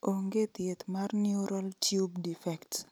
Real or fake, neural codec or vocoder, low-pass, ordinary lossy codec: real; none; none; none